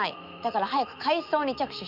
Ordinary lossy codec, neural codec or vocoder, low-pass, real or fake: none; autoencoder, 48 kHz, 128 numbers a frame, DAC-VAE, trained on Japanese speech; 5.4 kHz; fake